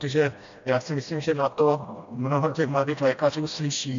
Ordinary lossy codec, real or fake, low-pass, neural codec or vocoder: MP3, 64 kbps; fake; 7.2 kHz; codec, 16 kHz, 1 kbps, FreqCodec, smaller model